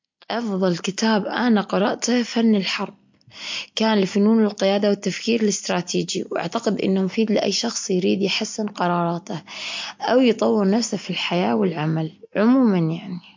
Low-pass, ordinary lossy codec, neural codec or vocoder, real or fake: 7.2 kHz; MP3, 48 kbps; none; real